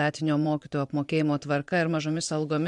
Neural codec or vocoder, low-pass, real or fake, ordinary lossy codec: vocoder, 24 kHz, 100 mel bands, Vocos; 10.8 kHz; fake; MP3, 64 kbps